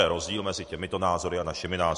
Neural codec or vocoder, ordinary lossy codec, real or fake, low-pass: none; MP3, 48 kbps; real; 14.4 kHz